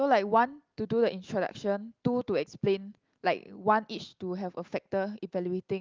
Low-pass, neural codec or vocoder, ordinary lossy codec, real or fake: 7.2 kHz; none; Opus, 32 kbps; real